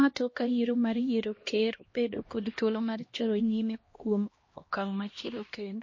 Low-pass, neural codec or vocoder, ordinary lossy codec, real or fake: 7.2 kHz; codec, 16 kHz, 1 kbps, X-Codec, HuBERT features, trained on LibriSpeech; MP3, 32 kbps; fake